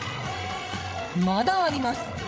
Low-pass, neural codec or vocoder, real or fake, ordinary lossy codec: none; codec, 16 kHz, 16 kbps, FreqCodec, larger model; fake; none